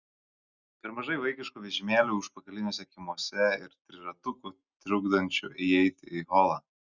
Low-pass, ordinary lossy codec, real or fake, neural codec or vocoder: 7.2 kHz; Opus, 64 kbps; real; none